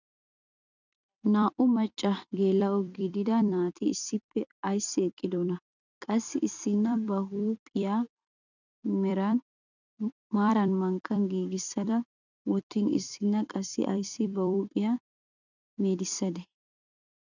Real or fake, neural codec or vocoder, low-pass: fake; vocoder, 44.1 kHz, 128 mel bands every 256 samples, BigVGAN v2; 7.2 kHz